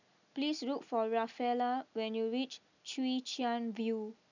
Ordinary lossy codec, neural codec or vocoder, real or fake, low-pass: none; none; real; 7.2 kHz